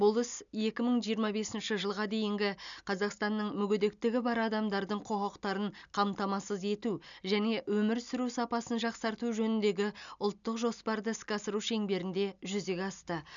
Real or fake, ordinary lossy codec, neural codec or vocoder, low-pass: real; none; none; 7.2 kHz